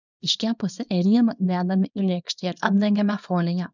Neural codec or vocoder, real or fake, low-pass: codec, 24 kHz, 0.9 kbps, WavTokenizer, small release; fake; 7.2 kHz